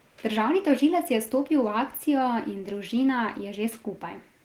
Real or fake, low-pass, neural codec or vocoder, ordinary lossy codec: real; 19.8 kHz; none; Opus, 16 kbps